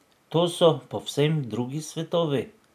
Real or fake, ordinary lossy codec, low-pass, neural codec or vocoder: real; none; 14.4 kHz; none